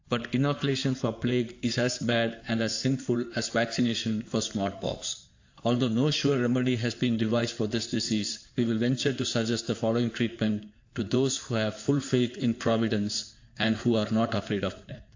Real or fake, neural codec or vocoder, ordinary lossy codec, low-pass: fake; codec, 16 kHz in and 24 kHz out, 2.2 kbps, FireRedTTS-2 codec; AAC, 48 kbps; 7.2 kHz